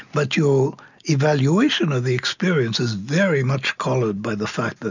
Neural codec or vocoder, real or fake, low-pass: none; real; 7.2 kHz